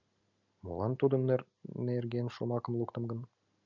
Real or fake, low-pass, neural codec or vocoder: real; 7.2 kHz; none